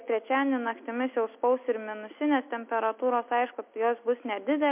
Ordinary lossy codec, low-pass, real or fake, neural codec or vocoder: MP3, 32 kbps; 3.6 kHz; real; none